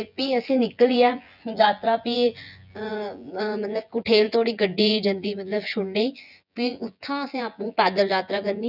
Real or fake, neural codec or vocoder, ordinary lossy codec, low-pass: fake; vocoder, 24 kHz, 100 mel bands, Vocos; none; 5.4 kHz